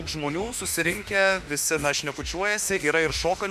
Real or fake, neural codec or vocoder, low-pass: fake; autoencoder, 48 kHz, 32 numbers a frame, DAC-VAE, trained on Japanese speech; 14.4 kHz